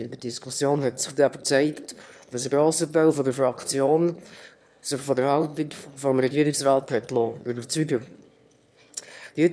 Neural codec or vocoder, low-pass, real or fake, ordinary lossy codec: autoencoder, 22.05 kHz, a latent of 192 numbers a frame, VITS, trained on one speaker; none; fake; none